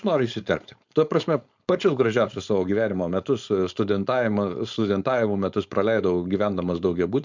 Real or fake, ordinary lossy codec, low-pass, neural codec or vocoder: fake; MP3, 64 kbps; 7.2 kHz; codec, 16 kHz, 4.8 kbps, FACodec